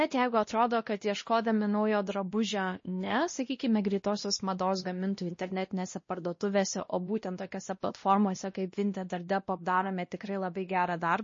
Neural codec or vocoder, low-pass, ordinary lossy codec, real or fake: codec, 16 kHz, 1 kbps, X-Codec, WavLM features, trained on Multilingual LibriSpeech; 7.2 kHz; MP3, 32 kbps; fake